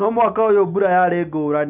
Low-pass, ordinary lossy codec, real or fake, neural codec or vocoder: 3.6 kHz; none; real; none